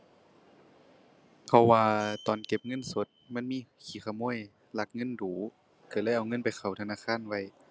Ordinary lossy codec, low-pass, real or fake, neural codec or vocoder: none; none; real; none